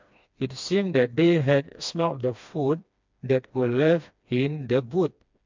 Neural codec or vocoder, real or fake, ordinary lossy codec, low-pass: codec, 16 kHz, 2 kbps, FreqCodec, smaller model; fake; MP3, 64 kbps; 7.2 kHz